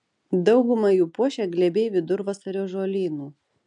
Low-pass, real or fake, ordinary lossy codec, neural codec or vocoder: 9.9 kHz; real; AAC, 64 kbps; none